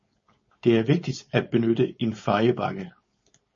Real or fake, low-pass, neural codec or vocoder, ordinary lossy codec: fake; 7.2 kHz; codec, 16 kHz, 4.8 kbps, FACodec; MP3, 32 kbps